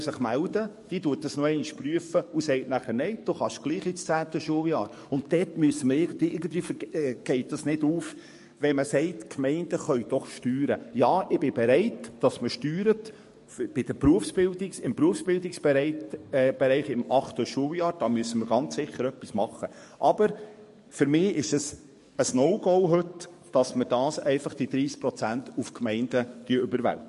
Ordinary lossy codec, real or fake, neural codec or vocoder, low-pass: MP3, 48 kbps; fake; codec, 44.1 kHz, 7.8 kbps, DAC; 14.4 kHz